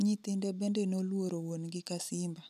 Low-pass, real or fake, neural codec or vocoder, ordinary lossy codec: 14.4 kHz; real; none; none